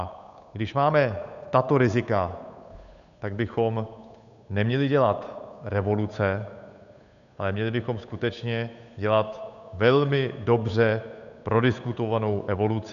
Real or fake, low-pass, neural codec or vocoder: fake; 7.2 kHz; codec, 16 kHz, 8 kbps, FunCodec, trained on Chinese and English, 25 frames a second